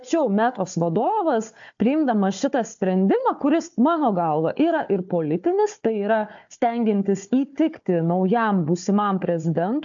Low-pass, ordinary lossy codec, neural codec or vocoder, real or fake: 7.2 kHz; AAC, 64 kbps; codec, 16 kHz, 4 kbps, FunCodec, trained on Chinese and English, 50 frames a second; fake